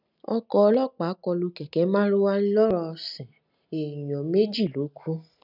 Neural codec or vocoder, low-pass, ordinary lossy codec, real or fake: none; 5.4 kHz; none; real